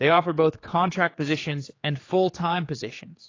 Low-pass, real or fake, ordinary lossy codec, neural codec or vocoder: 7.2 kHz; fake; AAC, 32 kbps; codec, 16 kHz, 4 kbps, X-Codec, HuBERT features, trained on general audio